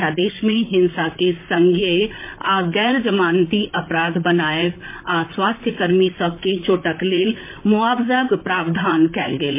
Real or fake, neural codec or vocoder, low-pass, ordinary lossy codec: fake; vocoder, 44.1 kHz, 128 mel bands, Pupu-Vocoder; 3.6 kHz; MP3, 24 kbps